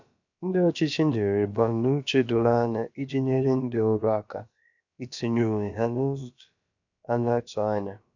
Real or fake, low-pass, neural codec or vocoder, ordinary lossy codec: fake; 7.2 kHz; codec, 16 kHz, about 1 kbps, DyCAST, with the encoder's durations; none